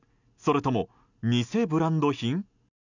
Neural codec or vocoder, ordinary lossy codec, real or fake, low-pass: none; none; real; 7.2 kHz